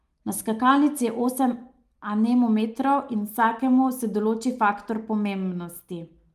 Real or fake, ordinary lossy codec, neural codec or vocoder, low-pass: real; Opus, 32 kbps; none; 14.4 kHz